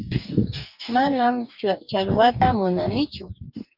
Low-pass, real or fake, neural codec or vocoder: 5.4 kHz; fake; codec, 44.1 kHz, 2.6 kbps, DAC